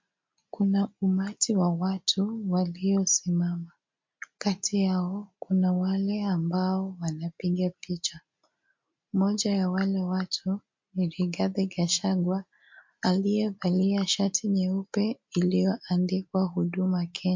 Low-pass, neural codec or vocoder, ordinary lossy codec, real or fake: 7.2 kHz; none; MP3, 48 kbps; real